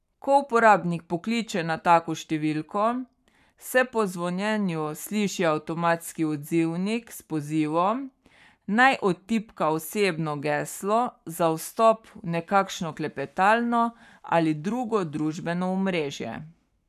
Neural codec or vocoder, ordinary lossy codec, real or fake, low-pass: codec, 44.1 kHz, 7.8 kbps, Pupu-Codec; none; fake; 14.4 kHz